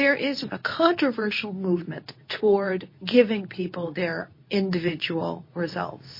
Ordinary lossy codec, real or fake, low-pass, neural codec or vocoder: MP3, 24 kbps; fake; 5.4 kHz; codec, 24 kHz, 0.9 kbps, WavTokenizer, medium speech release version 2